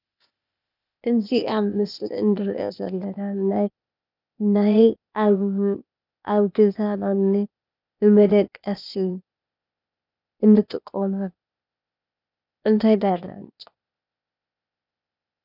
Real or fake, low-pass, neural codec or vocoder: fake; 5.4 kHz; codec, 16 kHz, 0.8 kbps, ZipCodec